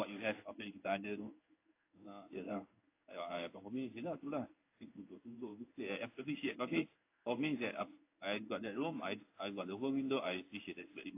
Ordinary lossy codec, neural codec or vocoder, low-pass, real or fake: AAC, 24 kbps; codec, 16 kHz in and 24 kHz out, 1 kbps, XY-Tokenizer; 3.6 kHz; fake